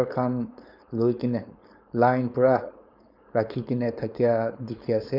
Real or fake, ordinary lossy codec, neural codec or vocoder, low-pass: fake; none; codec, 16 kHz, 4.8 kbps, FACodec; 5.4 kHz